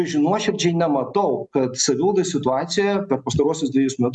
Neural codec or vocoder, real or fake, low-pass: none; real; 10.8 kHz